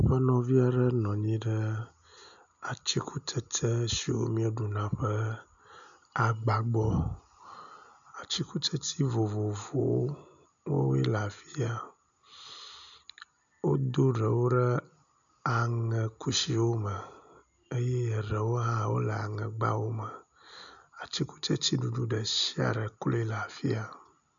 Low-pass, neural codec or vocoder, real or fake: 7.2 kHz; none; real